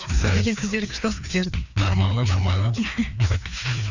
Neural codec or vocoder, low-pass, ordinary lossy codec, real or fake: codec, 16 kHz, 2 kbps, FreqCodec, larger model; 7.2 kHz; none; fake